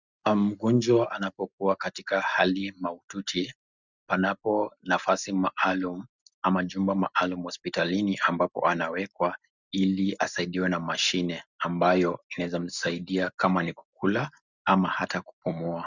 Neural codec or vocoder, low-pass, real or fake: none; 7.2 kHz; real